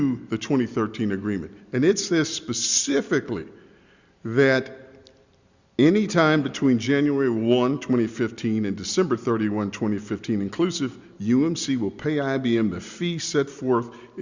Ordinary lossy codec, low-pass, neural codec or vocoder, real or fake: Opus, 64 kbps; 7.2 kHz; none; real